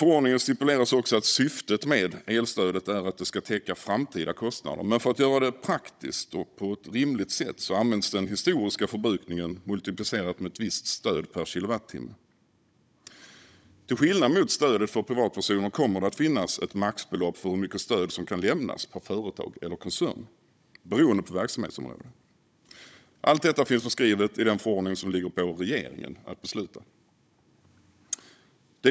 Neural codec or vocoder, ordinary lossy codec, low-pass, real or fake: codec, 16 kHz, 16 kbps, FunCodec, trained on Chinese and English, 50 frames a second; none; none; fake